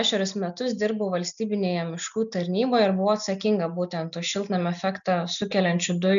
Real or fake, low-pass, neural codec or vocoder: real; 7.2 kHz; none